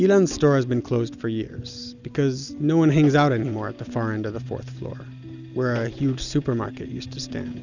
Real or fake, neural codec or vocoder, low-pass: real; none; 7.2 kHz